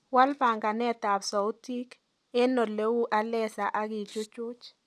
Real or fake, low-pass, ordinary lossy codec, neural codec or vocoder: real; none; none; none